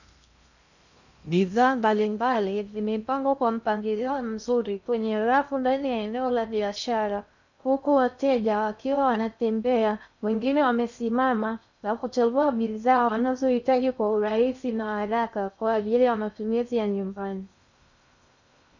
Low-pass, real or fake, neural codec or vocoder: 7.2 kHz; fake; codec, 16 kHz in and 24 kHz out, 0.6 kbps, FocalCodec, streaming, 2048 codes